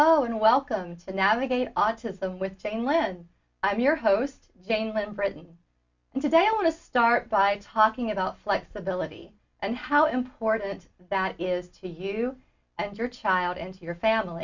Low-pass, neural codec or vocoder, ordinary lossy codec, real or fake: 7.2 kHz; none; Opus, 64 kbps; real